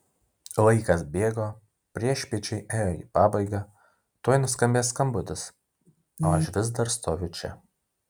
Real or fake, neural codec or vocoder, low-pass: real; none; 19.8 kHz